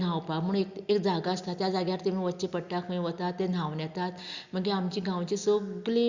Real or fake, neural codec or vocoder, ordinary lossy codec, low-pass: real; none; Opus, 64 kbps; 7.2 kHz